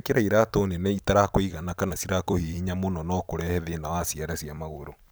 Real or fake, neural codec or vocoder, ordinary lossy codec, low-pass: fake; vocoder, 44.1 kHz, 128 mel bands every 256 samples, BigVGAN v2; none; none